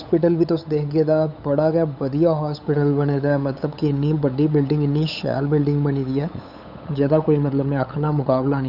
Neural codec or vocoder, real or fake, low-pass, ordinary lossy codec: codec, 16 kHz, 8 kbps, FunCodec, trained on LibriTTS, 25 frames a second; fake; 5.4 kHz; none